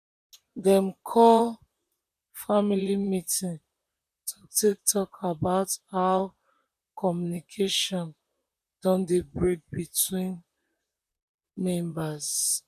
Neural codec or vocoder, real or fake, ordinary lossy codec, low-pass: vocoder, 44.1 kHz, 128 mel bands, Pupu-Vocoder; fake; none; 14.4 kHz